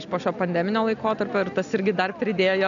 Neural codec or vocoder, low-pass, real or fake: none; 7.2 kHz; real